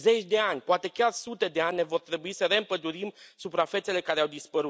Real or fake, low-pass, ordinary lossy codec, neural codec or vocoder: real; none; none; none